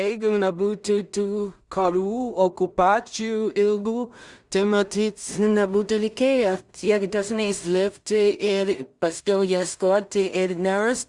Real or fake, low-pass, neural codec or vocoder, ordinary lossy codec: fake; 10.8 kHz; codec, 16 kHz in and 24 kHz out, 0.4 kbps, LongCat-Audio-Codec, two codebook decoder; Opus, 64 kbps